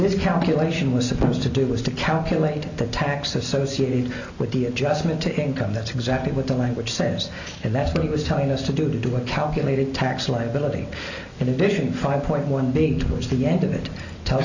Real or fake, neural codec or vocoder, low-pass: real; none; 7.2 kHz